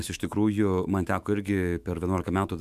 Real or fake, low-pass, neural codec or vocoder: fake; 14.4 kHz; autoencoder, 48 kHz, 128 numbers a frame, DAC-VAE, trained on Japanese speech